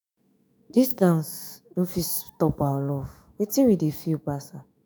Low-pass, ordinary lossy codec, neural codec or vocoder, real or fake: none; none; autoencoder, 48 kHz, 128 numbers a frame, DAC-VAE, trained on Japanese speech; fake